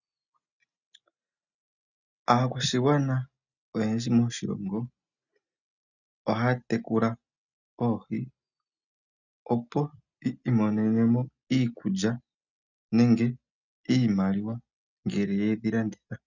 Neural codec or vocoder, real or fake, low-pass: none; real; 7.2 kHz